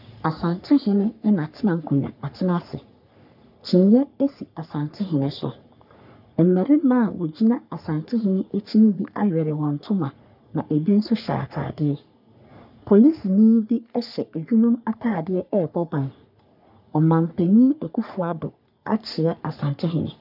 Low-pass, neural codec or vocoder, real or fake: 5.4 kHz; codec, 44.1 kHz, 3.4 kbps, Pupu-Codec; fake